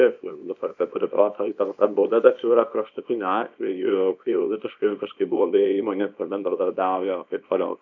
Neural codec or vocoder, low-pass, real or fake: codec, 24 kHz, 0.9 kbps, WavTokenizer, small release; 7.2 kHz; fake